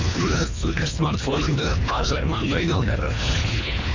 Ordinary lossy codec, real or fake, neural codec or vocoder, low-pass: AAC, 48 kbps; fake; codec, 24 kHz, 1.5 kbps, HILCodec; 7.2 kHz